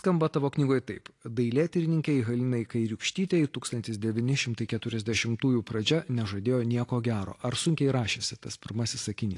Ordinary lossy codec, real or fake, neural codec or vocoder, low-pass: AAC, 48 kbps; real; none; 10.8 kHz